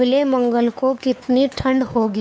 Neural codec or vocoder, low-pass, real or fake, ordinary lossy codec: codec, 16 kHz, 4 kbps, X-Codec, WavLM features, trained on Multilingual LibriSpeech; none; fake; none